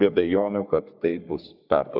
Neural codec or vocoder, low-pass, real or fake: codec, 44.1 kHz, 3.4 kbps, Pupu-Codec; 5.4 kHz; fake